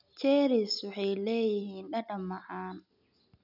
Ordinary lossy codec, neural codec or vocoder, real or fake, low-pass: none; none; real; 5.4 kHz